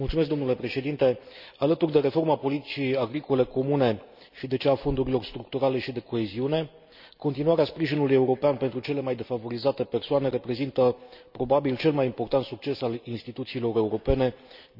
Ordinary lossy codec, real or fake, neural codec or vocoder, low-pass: none; real; none; 5.4 kHz